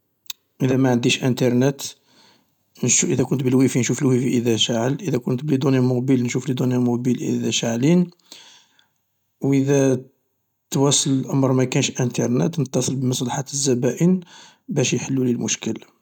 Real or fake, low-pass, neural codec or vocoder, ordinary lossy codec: real; 19.8 kHz; none; none